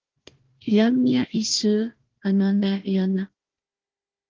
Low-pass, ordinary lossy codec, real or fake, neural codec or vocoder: 7.2 kHz; Opus, 16 kbps; fake; codec, 16 kHz, 1 kbps, FunCodec, trained on Chinese and English, 50 frames a second